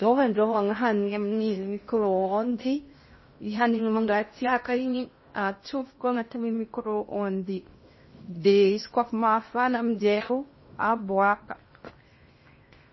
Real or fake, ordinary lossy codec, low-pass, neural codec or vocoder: fake; MP3, 24 kbps; 7.2 kHz; codec, 16 kHz in and 24 kHz out, 0.6 kbps, FocalCodec, streaming, 4096 codes